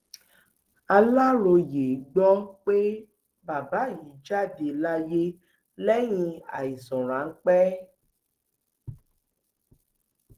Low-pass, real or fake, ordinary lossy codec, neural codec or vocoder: 14.4 kHz; real; Opus, 16 kbps; none